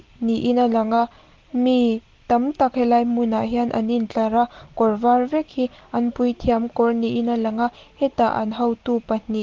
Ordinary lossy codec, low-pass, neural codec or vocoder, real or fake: Opus, 16 kbps; 7.2 kHz; none; real